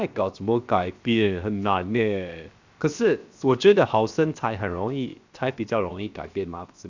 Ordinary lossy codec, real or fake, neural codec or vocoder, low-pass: Opus, 64 kbps; fake; codec, 16 kHz, 0.7 kbps, FocalCodec; 7.2 kHz